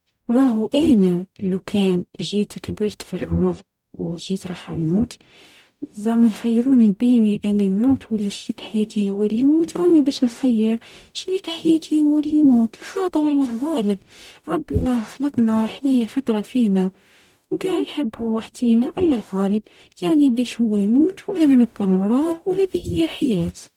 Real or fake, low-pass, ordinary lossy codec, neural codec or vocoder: fake; 19.8 kHz; none; codec, 44.1 kHz, 0.9 kbps, DAC